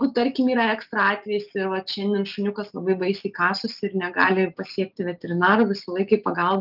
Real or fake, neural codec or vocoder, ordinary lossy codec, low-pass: real; none; Opus, 32 kbps; 5.4 kHz